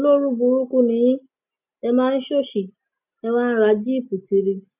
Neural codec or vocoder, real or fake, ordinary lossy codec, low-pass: none; real; none; 3.6 kHz